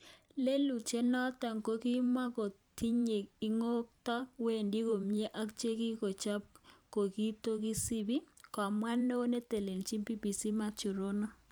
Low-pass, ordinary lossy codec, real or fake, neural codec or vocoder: none; none; fake; vocoder, 44.1 kHz, 128 mel bands every 512 samples, BigVGAN v2